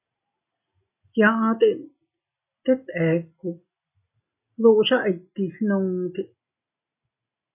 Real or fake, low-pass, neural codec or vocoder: real; 3.6 kHz; none